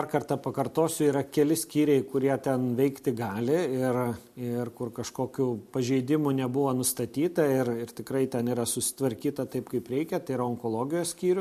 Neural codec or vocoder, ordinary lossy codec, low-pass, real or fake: none; MP3, 64 kbps; 14.4 kHz; real